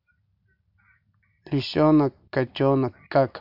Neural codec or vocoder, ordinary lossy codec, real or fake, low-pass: none; MP3, 48 kbps; real; 5.4 kHz